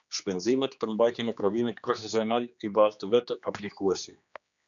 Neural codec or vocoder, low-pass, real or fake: codec, 16 kHz, 2 kbps, X-Codec, HuBERT features, trained on general audio; 7.2 kHz; fake